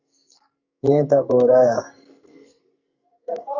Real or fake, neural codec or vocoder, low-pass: fake; codec, 44.1 kHz, 2.6 kbps, SNAC; 7.2 kHz